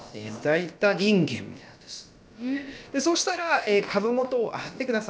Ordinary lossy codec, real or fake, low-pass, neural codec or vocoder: none; fake; none; codec, 16 kHz, about 1 kbps, DyCAST, with the encoder's durations